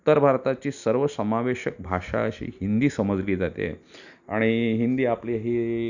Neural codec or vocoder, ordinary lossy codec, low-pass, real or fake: none; none; 7.2 kHz; real